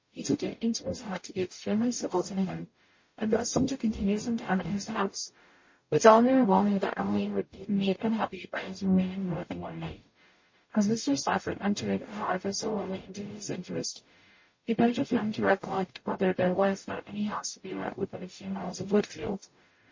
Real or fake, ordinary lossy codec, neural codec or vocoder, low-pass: fake; MP3, 32 kbps; codec, 44.1 kHz, 0.9 kbps, DAC; 7.2 kHz